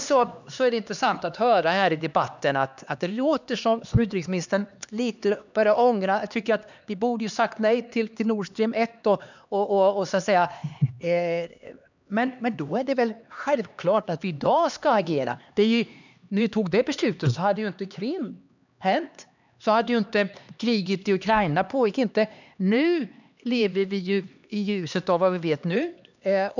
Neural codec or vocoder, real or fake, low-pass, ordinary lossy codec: codec, 16 kHz, 2 kbps, X-Codec, HuBERT features, trained on LibriSpeech; fake; 7.2 kHz; none